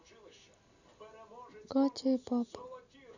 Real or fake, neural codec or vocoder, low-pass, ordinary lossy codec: real; none; 7.2 kHz; none